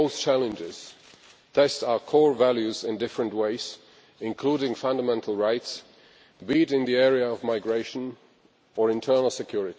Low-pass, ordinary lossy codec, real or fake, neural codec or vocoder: none; none; real; none